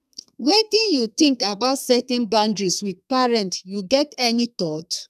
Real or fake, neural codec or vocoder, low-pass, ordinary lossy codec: fake; codec, 32 kHz, 1.9 kbps, SNAC; 14.4 kHz; none